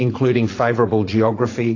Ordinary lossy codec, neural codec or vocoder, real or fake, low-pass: AAC, 32 kbps; vocoder, 44.1 kHz, 80 mel bands, Vocos; fake; 7.2 kHz